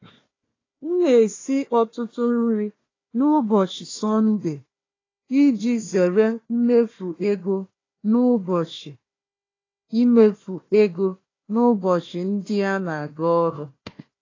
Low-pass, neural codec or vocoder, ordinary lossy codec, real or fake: 7.2 kHz; codec, 16 kHz, 1 kbps, FunCodec, trained on Chinese and English, 50 frames a second; AAC, 32 kbps; fake